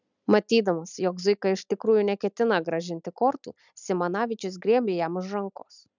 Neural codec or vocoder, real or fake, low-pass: none; real; 7.2 kHz